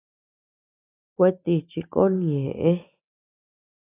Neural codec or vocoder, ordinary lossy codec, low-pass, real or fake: none; AAC, 16 kbps; 3.6 kHz; real